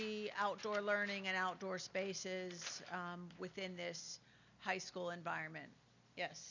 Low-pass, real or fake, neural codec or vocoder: 7.2 kHz; real; none